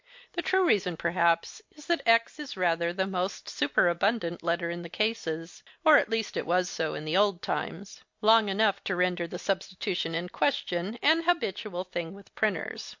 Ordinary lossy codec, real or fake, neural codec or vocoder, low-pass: MP3, 48 kbps; real; none; 7.2 kHz